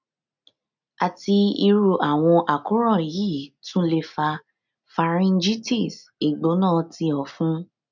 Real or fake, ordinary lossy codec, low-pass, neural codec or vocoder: real; none; 7.2 kHz; none